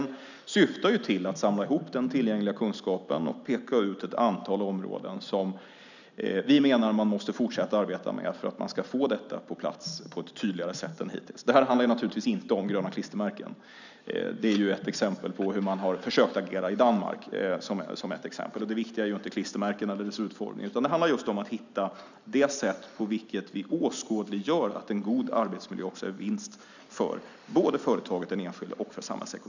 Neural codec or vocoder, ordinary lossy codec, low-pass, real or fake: none; none; 7.2 kHz; real